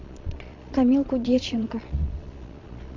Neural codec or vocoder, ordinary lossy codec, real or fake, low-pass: vocoder, 22.05 kHz, 80 mel bands, Vocos; AAC, 48 kbps; fake; 7.2 kHz